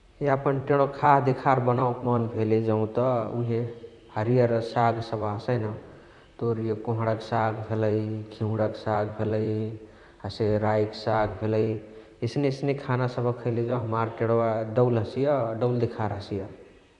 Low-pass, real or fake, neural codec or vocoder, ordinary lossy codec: 10.8 kHz; fake; vocoder, 44.1 kHz, 128 mel bands, Pupu-Vocoder; none